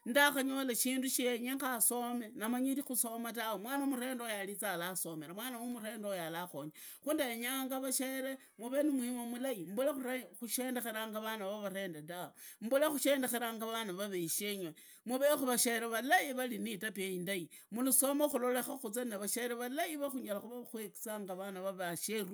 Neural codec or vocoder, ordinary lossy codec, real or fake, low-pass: none; none; real; none